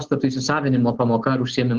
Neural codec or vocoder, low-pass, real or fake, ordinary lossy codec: none; 7.2 kHz; real; Opus, 16 kbps